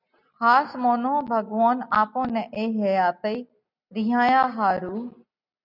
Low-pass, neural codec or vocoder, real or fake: 5.4 kHz; none; real